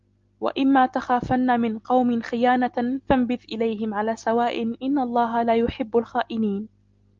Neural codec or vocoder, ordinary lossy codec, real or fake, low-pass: none; Opus, 32 kbps; real; 7.2 kHz